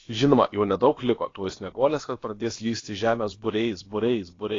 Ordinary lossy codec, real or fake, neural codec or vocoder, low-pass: AAC, 32 kbps; fake; codec, 16 kHz, about 1 kbps, DyCAST, with the encoder's durations; 7.2 kHz